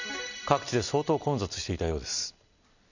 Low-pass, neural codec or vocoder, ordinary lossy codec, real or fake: 7.2 kHz; none; none; real